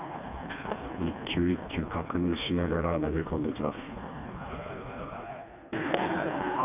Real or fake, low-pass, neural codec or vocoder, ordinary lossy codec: fake; 3.6 kHz; codec, 16 kHz, 2 kbps, FreqCodec, smaller model; none